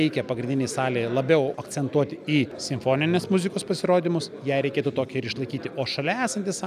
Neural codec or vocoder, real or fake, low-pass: none; real; 14.4 kHz